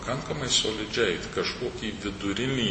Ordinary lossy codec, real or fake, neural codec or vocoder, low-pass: MP3, 32 kbps; real; none; 10.8 kHz